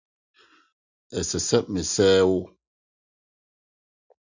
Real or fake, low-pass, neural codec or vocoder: real; 7.2 kHz; none